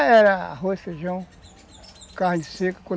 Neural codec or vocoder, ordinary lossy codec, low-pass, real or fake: none; none; none; real